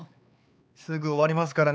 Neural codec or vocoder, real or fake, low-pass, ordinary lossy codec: codec, 16 kHz, 4 kbps, X-Codec, HuBERT features, trained on LibriSpeech; fake; none; none